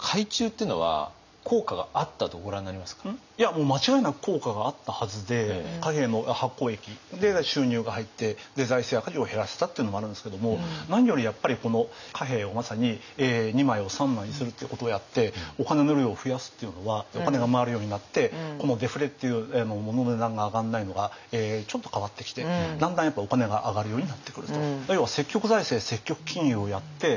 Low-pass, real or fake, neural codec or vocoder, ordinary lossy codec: 7.2 kHz; real; none; none